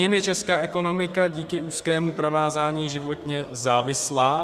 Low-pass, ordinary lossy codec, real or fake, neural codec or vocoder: 14.4 kHz; Opus, 64 kbps; fake; codec, 32 kHz, 1.9 kbps, SNAC